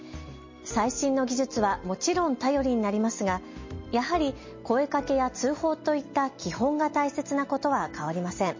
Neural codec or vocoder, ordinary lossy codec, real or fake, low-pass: none; MP3, 32 kbps; real; 7.2 kHz